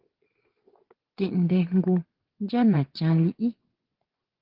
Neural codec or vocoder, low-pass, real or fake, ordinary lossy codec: vocoder, 24 kHz, 100 mel bands, Vocos; 5.4 kHz; fake; Opus, 16 kbps